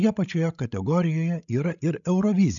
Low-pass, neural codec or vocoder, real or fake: 7.2 kHz; codec, 16 kHz, 16 kbps, FreqCodec, larger model; fake